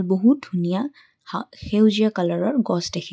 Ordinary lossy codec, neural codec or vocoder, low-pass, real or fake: none; none; none; real